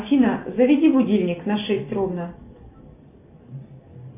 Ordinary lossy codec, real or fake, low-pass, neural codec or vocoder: MP3, 24 kbps; real; 3.6 kHz; none